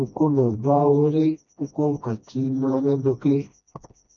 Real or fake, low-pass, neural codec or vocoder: fake; 7.2 kHz; codec, 16 kHz, 1 kbps, FreqCodec, smaller model